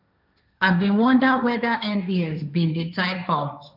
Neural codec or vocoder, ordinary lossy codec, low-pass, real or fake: codec, 16 kHz, 1.1 kbps, Voila-Tokenizer; none; 5.4 kHz; fake